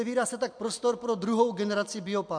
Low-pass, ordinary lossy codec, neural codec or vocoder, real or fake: 9.9 kHz; MP3, 64 kbps; none; real